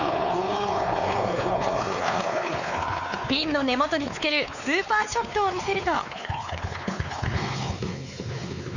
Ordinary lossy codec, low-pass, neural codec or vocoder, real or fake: none; 7.2 kHz; codec, 16 kHz, 4 kbps, X-Codec, WavLM features, trained on Multilingual LibriSpeech; fake